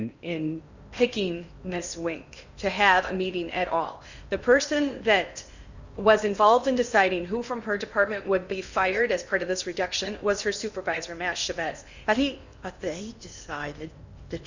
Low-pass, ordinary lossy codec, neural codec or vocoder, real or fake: 7.2 kHz; Opus, 64 kbps; codec, 16 kHz in and 24 kHz out, 0.8 kbps, FocalCodec, streaming, 65536 codes; fake